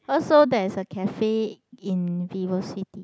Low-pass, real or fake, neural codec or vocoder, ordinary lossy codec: none; real; none; none